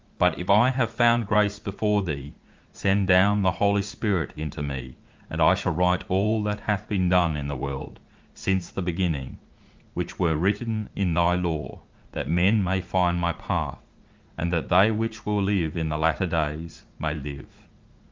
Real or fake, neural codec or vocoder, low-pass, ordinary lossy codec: fake; vocoder, 44.1 kHz, 80 mel bands, Vocos; 7.2 kHz; Opus, 24 kbps